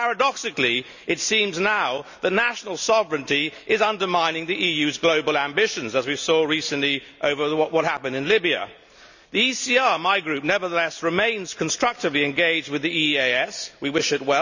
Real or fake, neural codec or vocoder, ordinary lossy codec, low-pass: real; none; none; 7.2 kHz